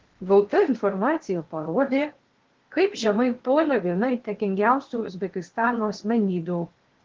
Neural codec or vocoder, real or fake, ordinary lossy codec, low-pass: codec, 16 kHz in and 24 kHz out, 0.8 kbps, FocalCodec, streaming, 65536 codes; fake; Opus, 16 kbps; 7.2 kHz